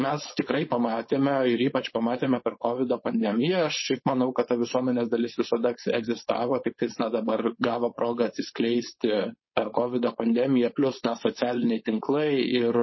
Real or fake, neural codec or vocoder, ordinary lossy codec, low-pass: fake; codec, 16 kHz, 4.8 kbps, FACodec; MP3, 24 kbps; 7.2 kHz